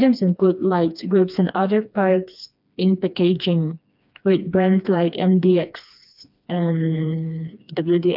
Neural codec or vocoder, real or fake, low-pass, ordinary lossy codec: codec, 16 kHz, 2 kbps, FreqCodec, smaller model; fake; 5.4 kHz; none